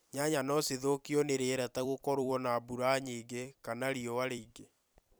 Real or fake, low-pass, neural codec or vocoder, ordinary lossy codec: fake; none; vocoder, 44.1 kHz, 128 mel bands every 256 samples, BigVGAN v2; none